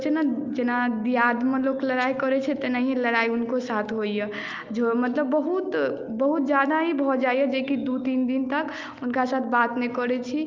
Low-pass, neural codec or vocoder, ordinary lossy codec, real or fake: 7.2 kHz; none; Opus, 24 kbps; real